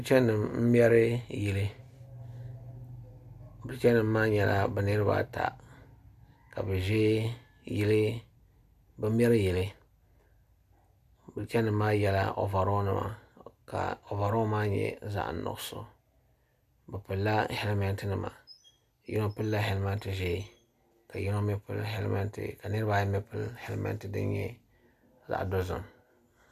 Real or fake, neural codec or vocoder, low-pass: real; none; 14.4 kHz